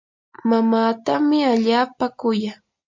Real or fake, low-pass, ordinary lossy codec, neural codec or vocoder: real; 7.2 kHz; MP3, 48 kbps; none